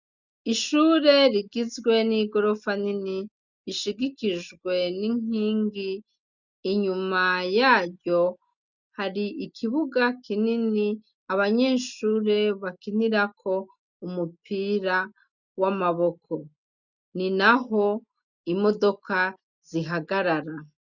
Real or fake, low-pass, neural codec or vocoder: real; 7.2 kHz; none